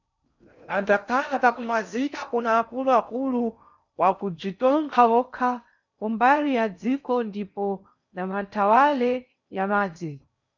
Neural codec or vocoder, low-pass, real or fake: codec, 16 kHz in and 24 kHz out, 0.6 kbps, FocalCodec, streaming, 4096 codes; 7.2 kHz; fake